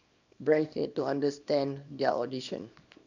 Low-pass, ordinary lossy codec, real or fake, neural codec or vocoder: 7.2 kHz; none; fake; codec, 24 kHz, 0.9 kbps, WavTokenizer, small release